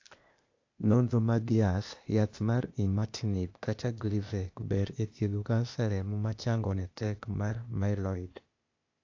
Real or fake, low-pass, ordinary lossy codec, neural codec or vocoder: fake; 7.2 kHz; AAC, 48 kbps; codec, 16 kHz, 0.8 kbps, ZipCodec